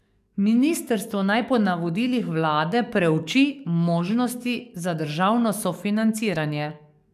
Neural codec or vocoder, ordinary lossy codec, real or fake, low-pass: codec, 44.1 kHz, 7.8 kbps, DAC; none; fake; 14.4 kHz